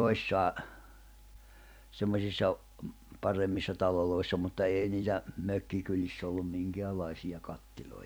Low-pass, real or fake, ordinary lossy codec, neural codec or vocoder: none; fake; none; vocoder, 44.1 kHz, 128 mel bands every 256 samples, BigVGAN v2